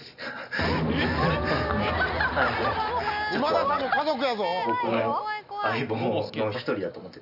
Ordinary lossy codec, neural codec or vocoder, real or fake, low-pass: none; none; real; 5.4 kHz